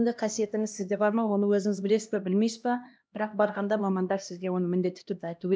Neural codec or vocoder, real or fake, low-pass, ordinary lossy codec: codec, 16 kHz, 1 kbps, X-Codec, HuBERT features, trained on LibriSpeech; fake; none; none